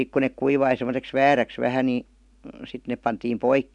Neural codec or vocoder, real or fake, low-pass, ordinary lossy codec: none; real; 10.8 kHz; MP3, 96 kbps